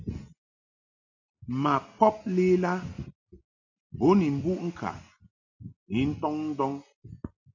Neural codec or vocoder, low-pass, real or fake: none; 7.2 kHz; real